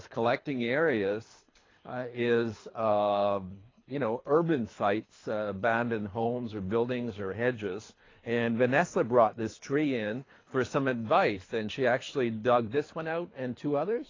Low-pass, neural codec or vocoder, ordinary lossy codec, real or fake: 7.2 kHz; codec, 24 kHz, 3 kbps, HILCodec; AAC, 32 kbps; fake